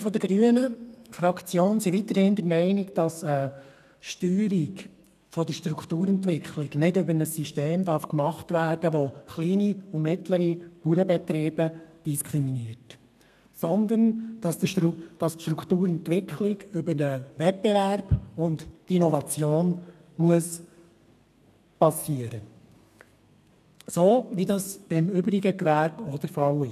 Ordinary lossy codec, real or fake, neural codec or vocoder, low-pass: none; fake; codec, 32 kHz, 1.9 kbps, SNAC; 14.4 kHz